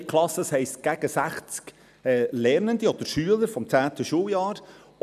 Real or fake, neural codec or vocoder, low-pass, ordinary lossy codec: real; none; 14.4 kHz; none